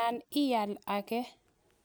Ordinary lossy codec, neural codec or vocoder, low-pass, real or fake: none; none; none; real